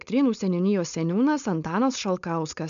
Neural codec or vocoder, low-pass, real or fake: codec, 16 kHz, 4.8 kbps, FACodec; 7.2 kHz; fake